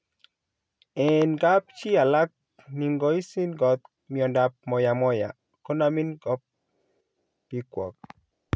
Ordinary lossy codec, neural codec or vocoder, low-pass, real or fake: none; none; none; real